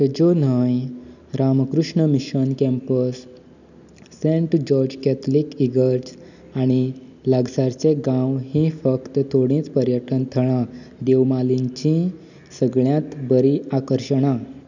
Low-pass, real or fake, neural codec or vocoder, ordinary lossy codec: 7.2 kHz; real; none; none